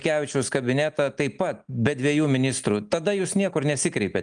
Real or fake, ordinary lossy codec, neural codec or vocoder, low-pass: real; Opus, 32 kbps; none; 9.9 kHz